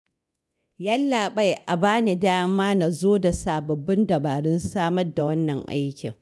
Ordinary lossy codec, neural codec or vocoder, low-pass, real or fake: none; codec, 24 kHz, 0.9 kbps, DualCodec; none; fake